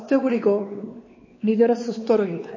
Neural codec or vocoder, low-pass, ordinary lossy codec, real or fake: codec, 16 kHz, 2 kbps, X-Codec, WavLM features, trained on Multilingual LibriSpeech; 7.2 kHz; MP3, 32 kbps; fake